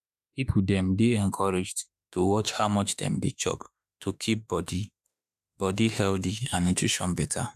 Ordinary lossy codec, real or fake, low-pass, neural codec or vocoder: none; fake; 14.4 kHz; autoencoder, 48 kHz, 32 numbers a frame, DAC-VAE, trained on Japanese speech